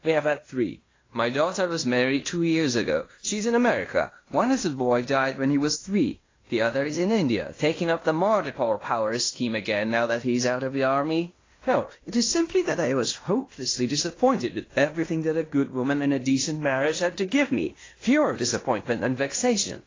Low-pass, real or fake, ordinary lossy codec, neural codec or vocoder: 7.2 kHz; fake; AAC, 32 kbps; codec, 16 kHz in and 24 kHz out, 0.9 kbps, LongCat-Audio-Codec, fine tuned four codebook decoder